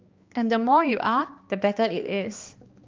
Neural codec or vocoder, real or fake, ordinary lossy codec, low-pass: codec, 16 kHz, 2 kbps, X-Codec, HuBERT features, trained on balanced general audio; fake; Opus, 32 kbps; 7.2 kHz